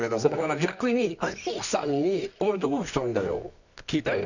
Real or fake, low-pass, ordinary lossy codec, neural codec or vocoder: fake; 7.2 kHz; none; codec, 24 kHz, 0.9 kbps, WavTokenizer, medium music audio release